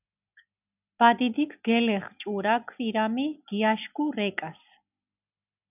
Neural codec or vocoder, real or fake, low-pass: none; real; 3.6 kHz